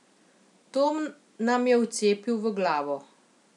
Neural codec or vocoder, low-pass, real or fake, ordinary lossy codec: none; 10.8 kHz; real; none